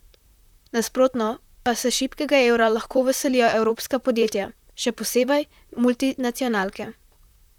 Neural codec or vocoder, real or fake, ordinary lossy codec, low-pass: vocoder, 44.1 kHz, 128 mel bands, Pupu-Vocoder; fake; none; 19.8 kHz